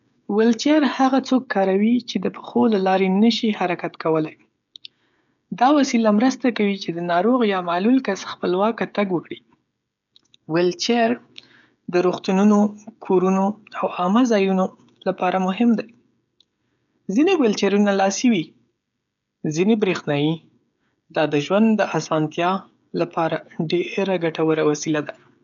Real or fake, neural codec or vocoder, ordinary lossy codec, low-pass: fake; codec, 16 kHz, 16 kbps, FreqCodec, smaller model; none; 7.2 kHz